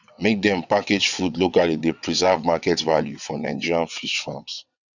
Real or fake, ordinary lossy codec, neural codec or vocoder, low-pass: fake; none; vocoder, 22.05 kHz, 80 mel bands, WaveNeXt; 7.2 kHz